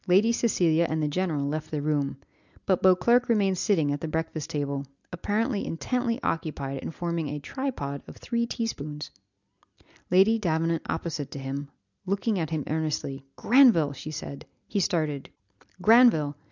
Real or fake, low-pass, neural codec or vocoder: real; 7.2 kHz; none